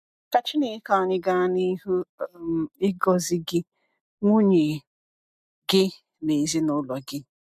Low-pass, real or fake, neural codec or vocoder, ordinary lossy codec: 14.4 kHz; real; none; MP3, 96 kbps